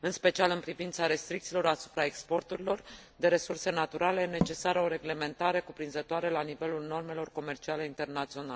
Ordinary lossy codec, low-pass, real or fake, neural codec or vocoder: none; none; real; none